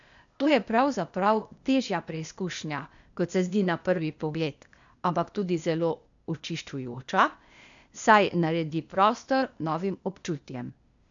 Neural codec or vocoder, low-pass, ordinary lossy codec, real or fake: codec, 16 kHz, 0.8 kbps, ZipCodec; 7.2 kHz; none; fake